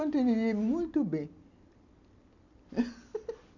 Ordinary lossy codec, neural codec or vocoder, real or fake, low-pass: none; none; real; 7.2 kHz